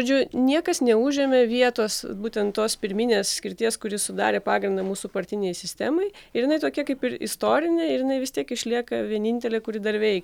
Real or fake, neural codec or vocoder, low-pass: real; none; 19.8 kHz